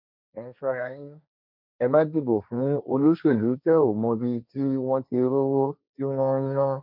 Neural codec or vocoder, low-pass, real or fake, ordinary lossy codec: codec, 16 kHz, 1.1 kbps, Voila-Tokenizer; 5.4 kHz; fake; none